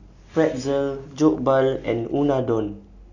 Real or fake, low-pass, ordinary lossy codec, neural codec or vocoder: real; 7.2 kHz; Opus, 64 kbps; none